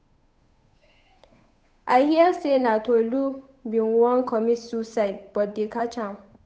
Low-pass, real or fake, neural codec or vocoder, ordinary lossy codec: none; fake; codec, 16 kHz, 8 kbps, FunCodec, trained on Chinese and English, 25 frames a second; none